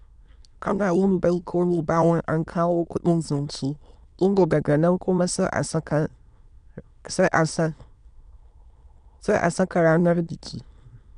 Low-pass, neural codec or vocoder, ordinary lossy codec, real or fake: 9.9 kHz; autoencoder, 22.05 kHz, a latent of 192 numbers a frame, VITS, trained on many speakers; none; fake